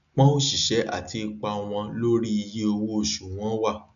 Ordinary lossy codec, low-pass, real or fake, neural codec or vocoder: AAC, 96 kbps; 7.2 kHz; real; none